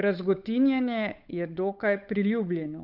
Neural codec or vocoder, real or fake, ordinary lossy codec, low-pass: codec, 16 kHz, 8 kbps, FunCodec, trained on Chinese and English, 25 frames a second; fake; none; 5.4 kHz